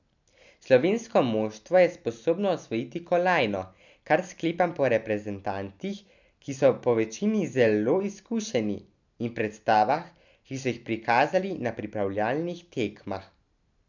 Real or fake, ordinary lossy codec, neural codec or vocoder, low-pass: real; none; none; 7.2 kHz